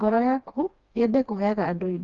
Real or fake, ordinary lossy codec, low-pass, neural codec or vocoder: fake; Opus, 32 kbps; 7.2 kHz; codec, 16 kHz, 2 kbps, FreqCodec, smaller model